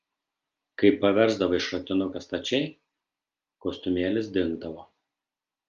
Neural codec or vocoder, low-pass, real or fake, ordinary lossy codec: none; 7.2 kHz; real; Opus, 32 kbps